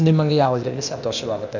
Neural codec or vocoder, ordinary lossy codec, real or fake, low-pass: codec, 16 kHz, 0.8 kbps, ZipCodec; none; fake; 7.2 kHz